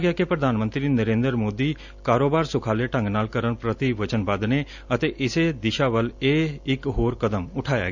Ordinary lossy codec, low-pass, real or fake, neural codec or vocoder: none; 7.2 kHz; real; none